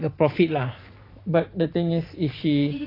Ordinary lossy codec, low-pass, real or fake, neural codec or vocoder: AAC, 24 kbps; 5.4 kHz; fake; vocoder, 44.1 kHz, 128 mel bands every 512 samples, BigVGAN v2